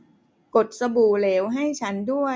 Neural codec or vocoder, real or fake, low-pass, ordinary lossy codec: none; real; none; none